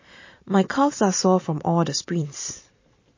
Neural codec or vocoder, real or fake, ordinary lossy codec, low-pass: vocoder, 44.1 kHz, 128 mel bands every 512 samples, BigVGAN v2; fake; MP3, 32 kbps; 7.2 kHz